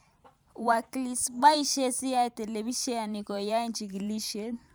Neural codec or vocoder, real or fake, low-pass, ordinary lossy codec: vocoder, 44.1 kHz, 128 mel bands every 512 samples, BigVGAN v2; fake; none; none